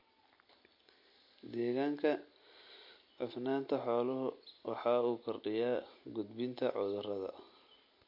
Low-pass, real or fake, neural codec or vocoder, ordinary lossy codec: 5.4 kHz; real; none; MP3, 32 kbps